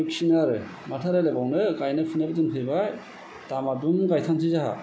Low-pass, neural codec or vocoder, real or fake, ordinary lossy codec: none; none; real; none